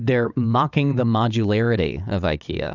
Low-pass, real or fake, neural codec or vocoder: 7.2 kHz; fake; vocoder, 22.05 kHz, 80 mel bands, WaveNeXt